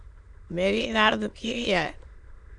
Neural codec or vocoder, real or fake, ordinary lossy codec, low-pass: autoencoder, 22.05 kHz, a latent of 192 numbers a frame, VITS, trained on many speakers; fake; MP3, 64 kbps; 9.9 kHz